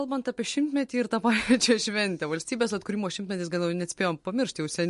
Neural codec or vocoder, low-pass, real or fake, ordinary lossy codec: none; 14.4 kHz; real; MP3, 48 kbps